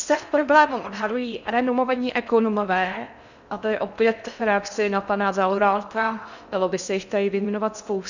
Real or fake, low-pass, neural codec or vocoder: fake; 7.2 kHz; codec, 16 kHz in and 24 kHz out, 0.6 kbps, FocalCodec, streaming, 4096 codes